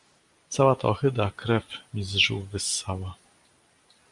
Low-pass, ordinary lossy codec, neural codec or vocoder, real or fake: 10.8 kHz; Opus, 64 kbps; none; real